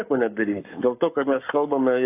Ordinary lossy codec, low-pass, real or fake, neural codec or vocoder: AAC, 24 kbps; 3.6 kHz; fake; codec, 44.1 kHz, 7.8 kbps, Pupu-Codec